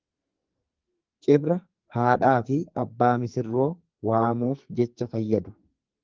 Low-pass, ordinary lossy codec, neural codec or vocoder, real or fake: 7.2 kHz; Opus, 32 kbps; codec, 44.1 kHz, 2.6 kbps, SNAC; fake